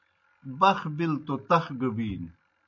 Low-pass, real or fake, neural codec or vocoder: 7.2 kHz; real; none